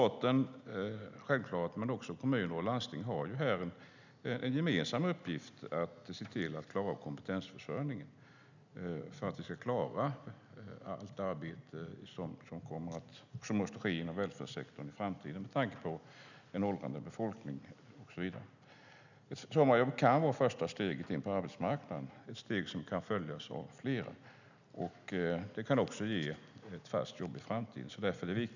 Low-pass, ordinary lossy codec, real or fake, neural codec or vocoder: 7.2 kHz; none; real; none